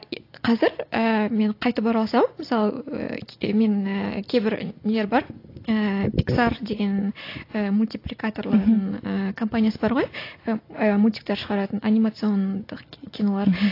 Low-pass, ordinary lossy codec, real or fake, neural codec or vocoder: 5.4 kHz; AAC, 32 kbps; real; none